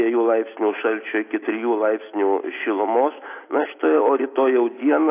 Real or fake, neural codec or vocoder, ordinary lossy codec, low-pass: real; none; AAC, 24 kbps; 3.6 kHz